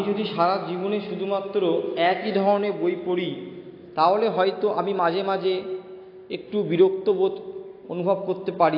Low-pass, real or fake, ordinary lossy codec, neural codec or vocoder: 5.4 kHz; real; AAC, 32 kbps; none